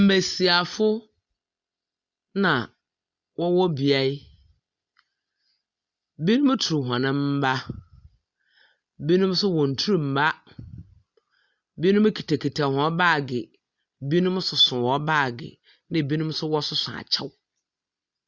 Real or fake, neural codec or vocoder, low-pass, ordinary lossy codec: real; none; 7.2 kHz; Opus, 64 kbps